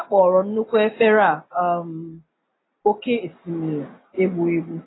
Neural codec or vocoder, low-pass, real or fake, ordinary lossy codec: none; 7.2 kHz; real; AAC, 16 kbps